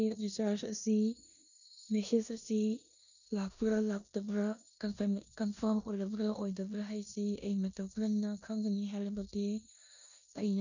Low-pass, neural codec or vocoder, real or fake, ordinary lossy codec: 7.2 kHz; codec, 16 kHz in and 24 kHz out, 0.9 kbps, LongCat-Audio-Codec, four codebook decoder; fake; none